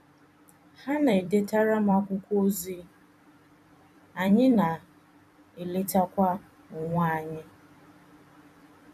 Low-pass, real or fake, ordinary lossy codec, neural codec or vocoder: 14.4 kHz; fake; none; vocoder, 44.1 kHz, 128 mel bands every 256 samples, BigVGAN v2